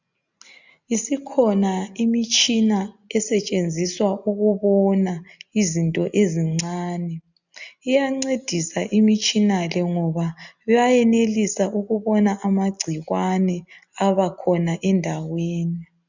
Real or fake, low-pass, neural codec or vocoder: real; 7.2 kHz; none